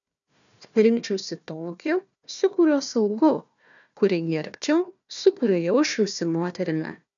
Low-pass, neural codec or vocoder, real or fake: 7.2 kHz; codec, 16 kHz, 1 kbps, FunCodec, trained on Chinese and English, 50 frames a second; fake